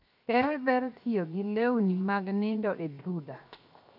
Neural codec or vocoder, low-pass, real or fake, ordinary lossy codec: codec, 16 kHz, 0.7 kbps, FocalCodec; 5.4 kHz; fake; none